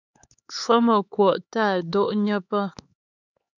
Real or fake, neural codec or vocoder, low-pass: fake; codec, 16 kHz, 4 kbps, X-Codec, HuBERT features, trained on LibriSpeech; 7.2 kHz